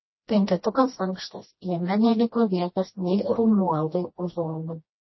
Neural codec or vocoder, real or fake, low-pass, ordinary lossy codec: codec, 16 kHz, 1 kbps, FreqCodec, smaller model; fake; 7.2 kHz; MP3, 24 kbps